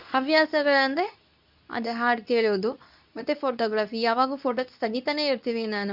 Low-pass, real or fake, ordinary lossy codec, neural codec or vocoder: 5.4 kHz; fake; none; codec, 24 kHz, 0.9 kbps, WavTokenizer, medium speech release version 2